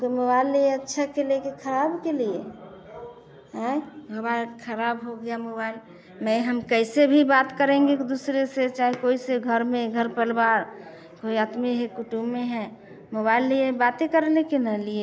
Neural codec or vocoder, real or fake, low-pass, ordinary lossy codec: none; real; none; none